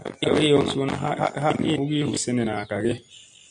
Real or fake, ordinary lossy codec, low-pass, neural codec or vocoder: real; MP3, 96 kbps; 9.9 kHz; none